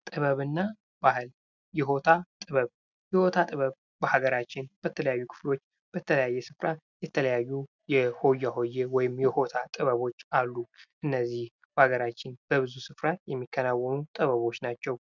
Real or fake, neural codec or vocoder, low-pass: real; none; 7.2 kHz